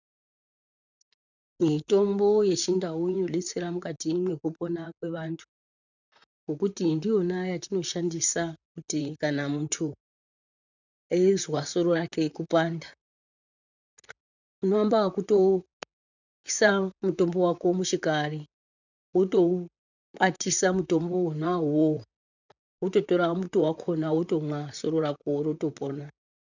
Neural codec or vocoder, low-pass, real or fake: vocoder, 44.1 kHz, 128 mel bands, Pupu-Vocoder; 7.2 kHz; fake